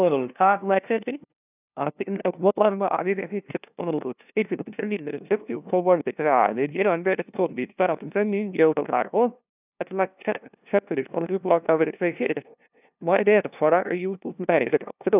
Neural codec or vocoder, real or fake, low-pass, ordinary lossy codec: codec, 16 kHz, 0.5 kbps, FunCodec, trained on LibriTTS, 25 frames a second; fake; 3.6 kHz; none